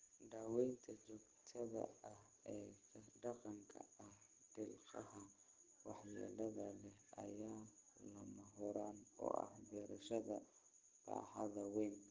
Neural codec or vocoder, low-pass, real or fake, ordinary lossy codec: none; 7.2 kHz; real; Opus, 16 kbps